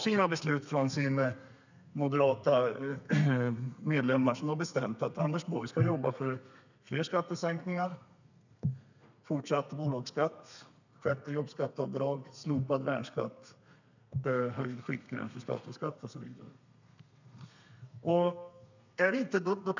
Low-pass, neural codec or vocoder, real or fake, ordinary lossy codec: 7.2 kHz; codec, 32 kHz, 1.9 kbps, SNAC; fake; none